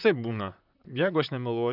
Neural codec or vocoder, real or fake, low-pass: vocoder, 44.1 kHz, 128 mel bands, Pupu-Vocoder; fake; 5.4 kHz